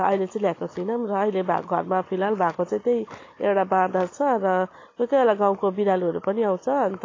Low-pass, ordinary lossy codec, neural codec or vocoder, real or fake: 7.2 kHz; AAC, 32 kbps; none; real